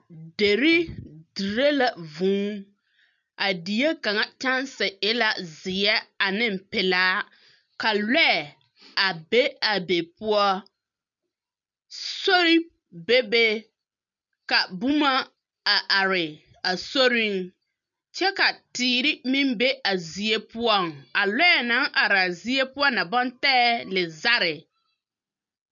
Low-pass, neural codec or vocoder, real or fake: 7.2 kHz; none; real